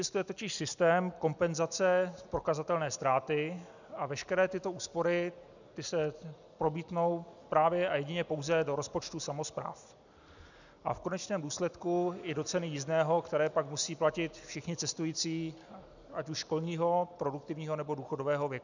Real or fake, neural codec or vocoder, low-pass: real; none; 7.2 kHz